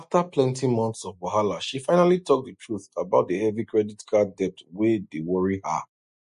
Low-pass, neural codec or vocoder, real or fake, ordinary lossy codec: 14.4 kHz; none; real; MP3, 48 kbps